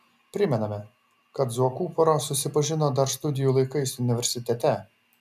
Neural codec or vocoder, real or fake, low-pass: none; real; 14.4 kHz